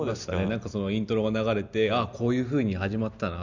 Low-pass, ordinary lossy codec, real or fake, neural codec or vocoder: 7.2 kHz; none; real; none